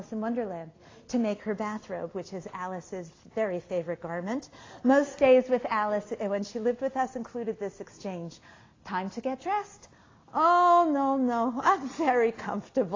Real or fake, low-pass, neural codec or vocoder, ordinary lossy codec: real; 7.2 kHz; none; AAC, 32 kbps